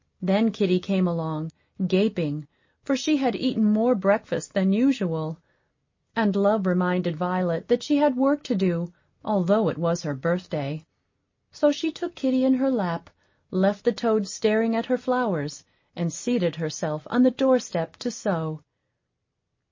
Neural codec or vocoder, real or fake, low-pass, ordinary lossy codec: none; real; 7.2 kHz; MP3, 32 kbps